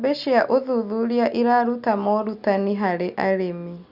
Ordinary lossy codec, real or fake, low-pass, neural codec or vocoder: Opus, 64 kbps; real; 5.4 kHz; none